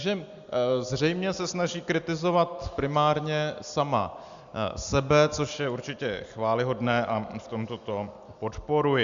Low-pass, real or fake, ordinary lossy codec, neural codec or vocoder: 7.2 kHz; real; Opus, 64 kbps; none